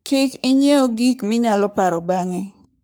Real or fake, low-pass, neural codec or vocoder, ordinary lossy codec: fake; none; codec, 44.1 kHz, 3.4 kbps, Pupu-Codec; none